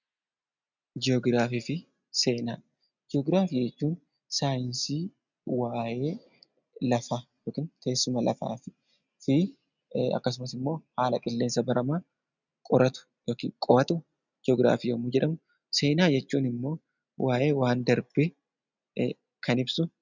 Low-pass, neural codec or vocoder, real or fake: 7.2 kHz; none; real